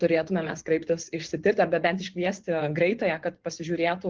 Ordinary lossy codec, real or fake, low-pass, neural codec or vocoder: Opus, 16 kbps; fake; 7.2 kHz; vocoder, 24 kHz, 100 mel bands, Vocos